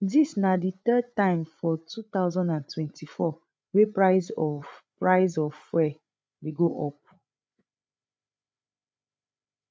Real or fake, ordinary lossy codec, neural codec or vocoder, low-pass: fake; none; codec, 16 kHz, 8 kbps, FreqCodec, larger model; none